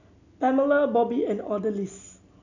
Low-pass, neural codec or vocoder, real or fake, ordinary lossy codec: 7.2 kHz; none; real; none